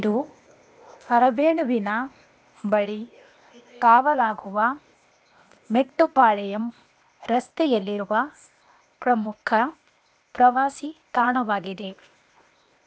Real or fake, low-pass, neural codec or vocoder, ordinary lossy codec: fake; none; codec, 16 kHz, 0.8 kbps, ZipCodec; none